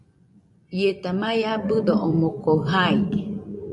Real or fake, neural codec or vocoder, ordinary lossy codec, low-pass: fake; vocoder, 24 kHz, 100 mel bands, Vocos; MP3, 96 kbps; 10.8 kHz